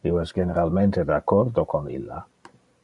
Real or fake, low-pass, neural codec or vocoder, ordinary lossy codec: fake; 10.8 kHz; vocoder, 44.1 kHz, 128 mel bands every 512 samples, BigVGAN v2; Opus, 64 kbps